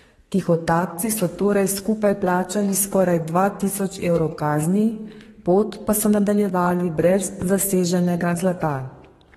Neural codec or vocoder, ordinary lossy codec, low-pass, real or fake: codec, 32 kHz, 1.9 kbps, SNAC; AAC, 32 kbps; 14.4 kHz; fake